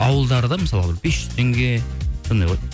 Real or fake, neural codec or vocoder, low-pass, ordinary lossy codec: real; none; none; none